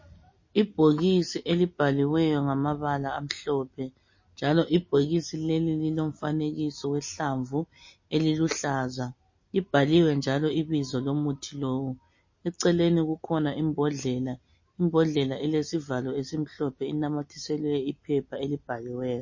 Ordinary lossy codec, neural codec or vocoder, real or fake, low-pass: MP3, 32 kbps; none; real; 7.2 kHz